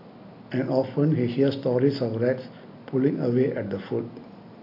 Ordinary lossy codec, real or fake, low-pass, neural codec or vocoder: AAC, 32 kbps; real; 5.4 kHz; none